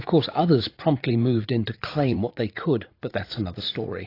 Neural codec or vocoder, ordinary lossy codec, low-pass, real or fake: vocoder, 44.1 kHz, 80 mel bands, Vocos; AAC, 32 kbps; 5.4 kHz; fake